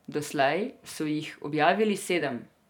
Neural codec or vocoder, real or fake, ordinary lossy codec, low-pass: vocoder, 44.1 kHz, 128 mel bands every 512 samples, BigVGAN v2; fake; none; 19.8 kHz